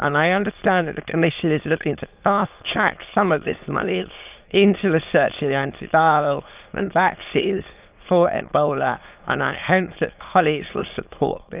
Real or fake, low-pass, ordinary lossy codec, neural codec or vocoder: fake; 3.6 kHz; Opus, 24 kbps; autoencoder, 22.05 kHz, a latent of 192 numbers a frame, VITS, trained on many speakers